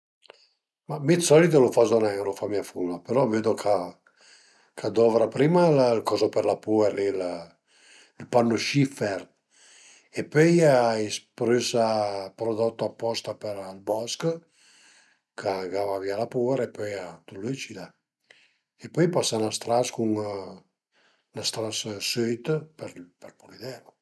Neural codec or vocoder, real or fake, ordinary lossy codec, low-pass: none; real; none; none